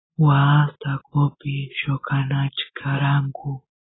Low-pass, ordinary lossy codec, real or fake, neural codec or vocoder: 7.2 kHz; AAC, 16 kbps; real; none